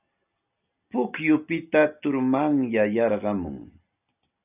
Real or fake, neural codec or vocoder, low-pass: real; none; 3.6 kHz